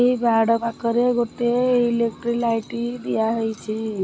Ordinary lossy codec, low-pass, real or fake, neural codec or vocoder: none; none; real; none